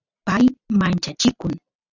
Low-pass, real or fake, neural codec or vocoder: 7.2 kHz; real; none